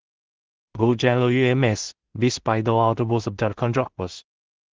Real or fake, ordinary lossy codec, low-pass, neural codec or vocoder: fake; Opus, 16 kbps; 7.2 kHz; codec, 16 kHz in and 24 kHz out, 0.4 kbps, LongCat-Audio-Codec, two codebook decoder